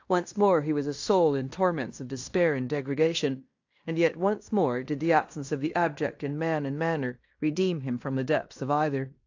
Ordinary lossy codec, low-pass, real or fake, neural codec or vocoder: AAC, 48 kbps; 7.2 kHz; fake; codec, 16 kHz in and 24 kHz out, 0.9 kbps, LongCat-Audio-Codec, fine tuned four codebook decoder